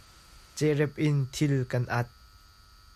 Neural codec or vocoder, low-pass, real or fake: none; 14.4 kHz; real